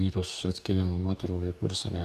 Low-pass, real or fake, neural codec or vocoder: 14.4 kHz; fake; codec, 44.1 kHz, 2.6 kbps, DAC